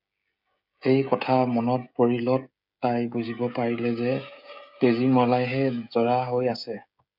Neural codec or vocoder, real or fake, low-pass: codec, 16 kHz, 16 kbps, FreqCodec, smaller model; fake; 5.4 kHz